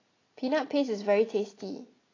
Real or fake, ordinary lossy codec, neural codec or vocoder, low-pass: real; AAC, 32 kbps; none; 7.2 kHz